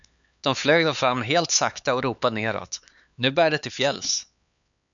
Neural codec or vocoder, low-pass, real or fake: codec, 16 kHz, 4 kbps, X-Codec, HuBERT features, trained on LibriSpeech; 7.2 kHz; fake